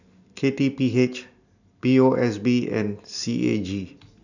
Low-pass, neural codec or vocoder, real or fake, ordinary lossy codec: 7.2 kHz; none; real; none